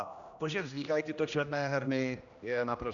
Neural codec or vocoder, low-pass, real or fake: codec, 16 kHz, 1 kbps, X-Codec, HuBERT features, trained on general audio; 7.2 kHz; fake